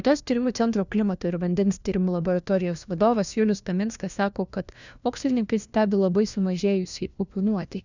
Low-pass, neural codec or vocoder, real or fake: 7.2 kHz; codec, 16 kHz, 1 kbps, FunCodec, trained on LibriTTS, 50 frames a second; fake